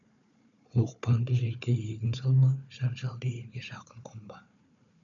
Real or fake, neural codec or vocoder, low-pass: fake; codec, 16 kHz, 4 kbps, FunCodec, trained on Chinese and English, 50 frames a second; 7.2 kHz